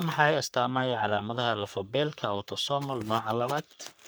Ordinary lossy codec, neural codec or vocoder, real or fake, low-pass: none; codec, 44.1 kHz, 3.4 kbps, Pupu-Codec; fake; none